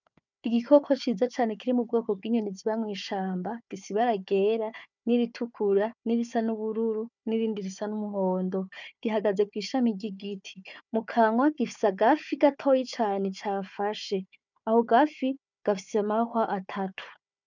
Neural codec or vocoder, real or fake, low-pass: codec, 16 kHz, 4 kbps, FunCodec, trained on Chinese and English, 50 frames a second; fake; 7.2 kHz